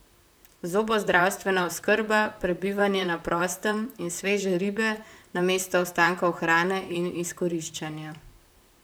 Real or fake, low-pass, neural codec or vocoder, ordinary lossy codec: fake; none; vocoder, 44.1 kHz, 128 mel bands, Pupu-Vocoder; none